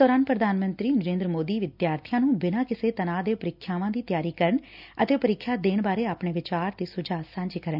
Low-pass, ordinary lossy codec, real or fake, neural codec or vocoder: 5.4 kHz; none; real; none